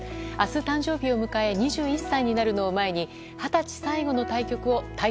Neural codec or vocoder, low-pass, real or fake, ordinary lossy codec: none; none; real; none